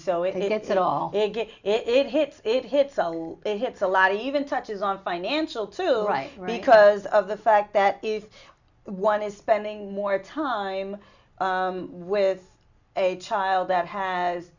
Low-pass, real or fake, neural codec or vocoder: 7.2 kHz; real; none